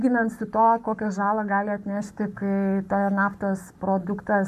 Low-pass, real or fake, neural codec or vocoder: 14.4 kHz; fake; codec, 44.1 kHz, 7.8 kbps, Pupu-Codec